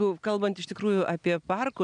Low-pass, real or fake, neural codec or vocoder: 9.9 kHz; real; none